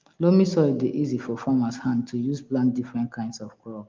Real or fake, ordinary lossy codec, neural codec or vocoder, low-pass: real; Opus, 32 kbps; none; 7.2 kHz